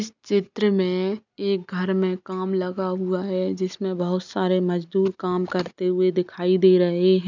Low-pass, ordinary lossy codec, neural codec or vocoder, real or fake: 7.2 kHz; none; none; real